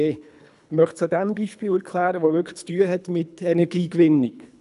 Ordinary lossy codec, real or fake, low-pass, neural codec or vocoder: none; fake; 10.8 kHz; codec, 24 kHz, 3 kbps, HILCodec